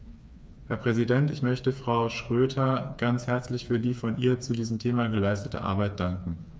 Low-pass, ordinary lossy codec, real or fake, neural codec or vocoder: none; none; fake; codec, 16 kHz, 4 kbps, FreqCodec, smaller model